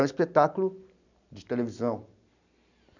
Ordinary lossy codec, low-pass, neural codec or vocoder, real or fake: none; 7.2 kHz; none; real